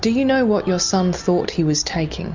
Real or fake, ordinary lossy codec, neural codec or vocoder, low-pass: real; MP3, 64 kbps; none; 7.2 kHz